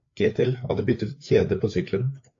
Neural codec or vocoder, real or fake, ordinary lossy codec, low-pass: codec, 16 kHz, 4 kbps, FreqCodec, larger model; fake; AAC, 48 kbps; 7.2 kHz